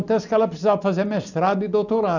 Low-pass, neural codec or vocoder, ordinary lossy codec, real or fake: 7.2 kHz; none; none; real